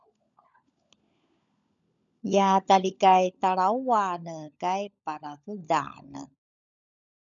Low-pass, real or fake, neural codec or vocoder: 7.2 kHz; fake; codec, 16 kHz, 16 kbps, FunCodec, trained on LibriTTS, 50 frames a second